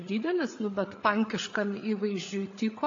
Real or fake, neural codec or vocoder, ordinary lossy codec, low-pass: fake; codec, 16 kHz, 8 kbps, FreqCodec, larger model; AAC, 48 kbps; 7.2 kHz